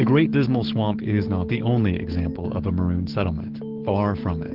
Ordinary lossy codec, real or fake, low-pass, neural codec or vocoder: Opus, 16 kbps; fake; 5.4 kHz; codec, 16 kHz, 6 kbps, DAC